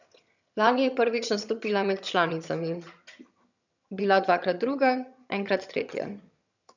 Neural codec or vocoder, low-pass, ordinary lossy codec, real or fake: vocoder, 22.05 kHz, 80 mel bands, HiFi-GAN; 7.2 kHz; none; fake